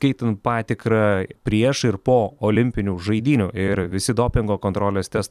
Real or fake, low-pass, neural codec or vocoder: fake; 14.4 kHz; vocoder, 44.1 kHz, 128 mel bands every 256 samples, BigVGAN v2